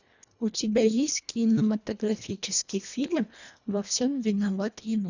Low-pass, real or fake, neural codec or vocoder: 7.2 kHz; fake; codec, 24 kHz, 1.5 kbps, HILCodec